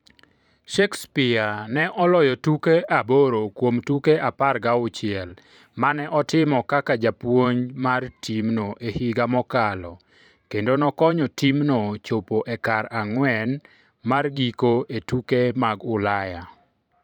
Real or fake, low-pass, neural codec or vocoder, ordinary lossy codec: fake; 19.8 kHz; vocoder, 44.1 kHz, 128 mel bands every 512 samples, BigVGAN v2; none